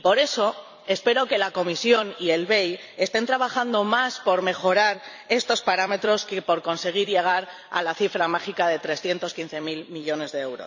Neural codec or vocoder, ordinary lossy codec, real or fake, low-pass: vocoder, 44.1 kHz, 128 mel bands every 256 samples, BigVGAN v2; none; fake; 7.2 kHz